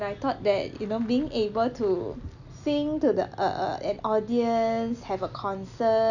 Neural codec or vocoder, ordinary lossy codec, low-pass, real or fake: none; none; 7.2 kHz; real